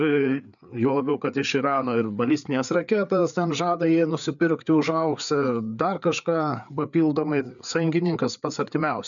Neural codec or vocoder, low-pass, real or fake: codec, 16 kHz, 4 kbps, FreqCodec, larger model; 7.2 kHz; fake